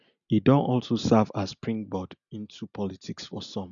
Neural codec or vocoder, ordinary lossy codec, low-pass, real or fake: none; MP3, 96 kbps; 7.2 kHz; real